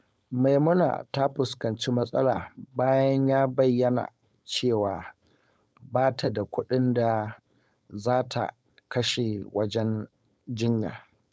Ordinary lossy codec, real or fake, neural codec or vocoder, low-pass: none; fake; codec, 16 kHz, 4.8 kbps, FACodec; none